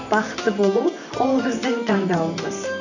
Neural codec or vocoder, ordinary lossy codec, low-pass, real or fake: vocoder, 44.1 kHz, 128 mel bands, Pupu-Vocoder; none; 7.2 kHz; fake